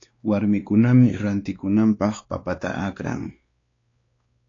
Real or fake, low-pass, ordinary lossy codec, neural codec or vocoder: fake; 7.2 kHz; AAC, 32 kbps; codec, 16 kHz, 2 kbps, X-Codec, WavLM features, trained on Multilingual LibriSpeech